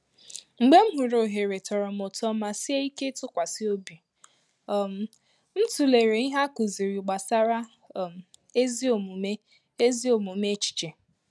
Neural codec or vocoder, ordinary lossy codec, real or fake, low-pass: none; none; real; none